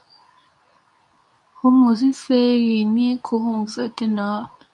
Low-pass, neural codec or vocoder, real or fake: 10.8 kHz; codec, 24 kHz, 0.9 kbps, WavTokenizer, medium speech release version 2; fake